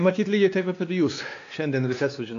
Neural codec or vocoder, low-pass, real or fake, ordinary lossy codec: codec, 16 kHz, 2 kbps, X-Codec, WavLM features, trained on Multilingual LibriSpeech; 7.2 kHz; fake; AAC, 48 kbps